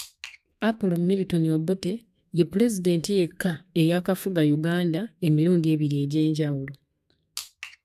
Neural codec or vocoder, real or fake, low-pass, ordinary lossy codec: codec, 32 kHz, 1.9 kbps, SNAC; fake; 14.4 kHz; none